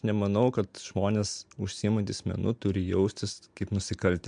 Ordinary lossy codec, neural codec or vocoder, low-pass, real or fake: MP3, 64 kbps; none; 9.9 kHz; real